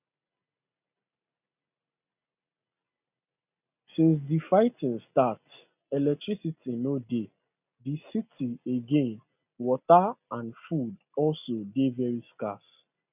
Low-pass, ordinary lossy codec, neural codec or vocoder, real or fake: 3.6 kHz; none; none; real